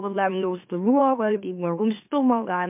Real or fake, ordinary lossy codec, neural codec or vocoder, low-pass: fake; MP3, 32 kbps; autoencoder, 44.1 kHz, a latent of 192 numbers a frame, MeloTTS; 3.6 kHz